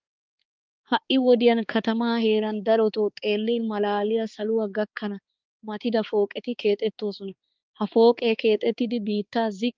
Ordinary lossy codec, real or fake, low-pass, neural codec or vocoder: Opus, 32 kbps; fake; 7.2 kHz; codec, 16 kHz, 4 kbps, X-Codec, HuBERT features, trained on balanced general audio